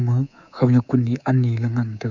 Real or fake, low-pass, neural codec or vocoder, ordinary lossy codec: fake; 7.2 kHz; codec, 24 kHz, 3.1 kbps, DualCodec; none